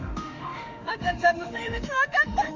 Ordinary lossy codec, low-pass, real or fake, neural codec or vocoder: MP3, 64 kbps; 7.2 kHz; fake; autoencoder, 48 kHz, 32 numbers a frame, DAC-VAE, trained on Japanese speech